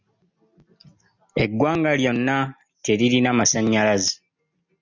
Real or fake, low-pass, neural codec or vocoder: real; 7.2 kHz; none